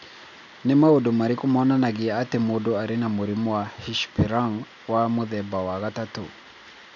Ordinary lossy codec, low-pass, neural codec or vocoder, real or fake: none; 7.2 kHz; none; real